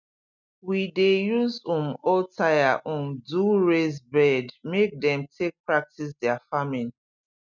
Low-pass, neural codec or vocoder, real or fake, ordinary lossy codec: 7.2 kHz; none; real; none